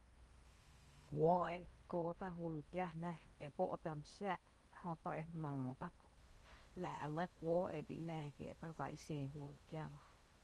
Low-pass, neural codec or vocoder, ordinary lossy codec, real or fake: 10.8 kHz; codec, 16 kHz in and 24 kHz out, 0.8 kbps, FocalCodec, streaming, 65536 codes; Opus, 32 kbps; fake